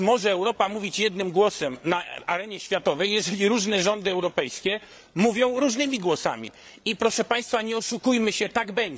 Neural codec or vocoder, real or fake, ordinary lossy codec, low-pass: codec, 16 kHz, 8 kbps, FreqCodec, larger model; fake; none; none